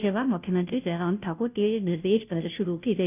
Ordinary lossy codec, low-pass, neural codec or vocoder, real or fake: none; 3.6 kHz; codec, 16 kHz, 0.5 kbps, FunCodec, trained on Chinese and English, 25 frames a second; fake